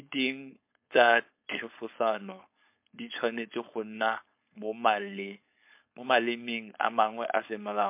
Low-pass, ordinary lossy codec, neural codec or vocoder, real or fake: 3.6 kHz; MP3, 32 kbps; codec, 16 kHz, 4.8 kbps, FACodec; fake